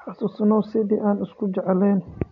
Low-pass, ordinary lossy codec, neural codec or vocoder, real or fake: 7.2 kHz; none; none; real